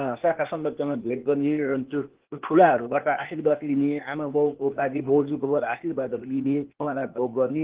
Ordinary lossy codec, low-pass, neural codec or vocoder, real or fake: Opus, 16 kbps; 3.6 kHz; codec, 16 kHz, 0.8 kbps, ZipCodec; fake